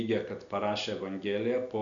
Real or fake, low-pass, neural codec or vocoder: real; 7.2 kHz; none